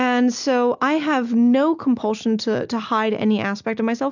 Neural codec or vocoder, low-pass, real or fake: none; 7.2 kHz; real